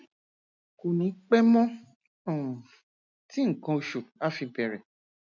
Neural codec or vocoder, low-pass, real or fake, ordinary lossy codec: none; 7.2 kHz; real; none